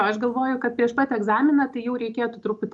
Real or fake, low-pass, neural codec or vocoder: real; 10.8 kHz; none